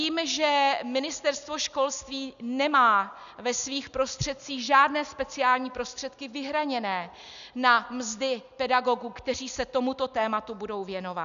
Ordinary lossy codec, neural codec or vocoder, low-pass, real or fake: MP3, 96 kbps; none; 7.2 kHz; real